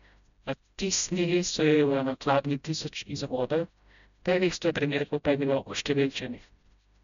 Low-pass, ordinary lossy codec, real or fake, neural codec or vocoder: 7.2 kHz; MP3, 96 kbps; fake; codec, 16 kHz, 0.5 kbps, FreqCodec, smaller model